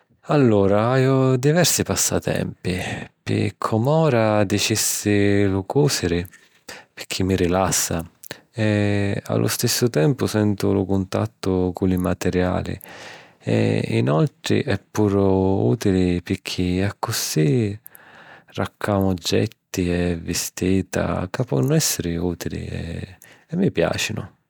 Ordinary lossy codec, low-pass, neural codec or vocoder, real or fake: none; none; none; real